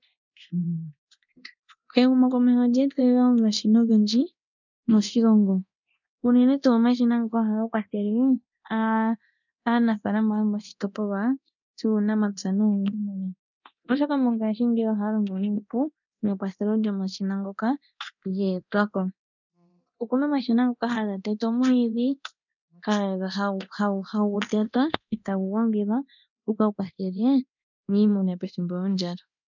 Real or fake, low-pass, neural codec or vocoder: fake; 7.2 kHz; codec, 24 kHz, 0.9 kbps, DualCodec